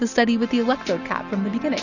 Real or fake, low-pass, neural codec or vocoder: real; 7.2 kHz; none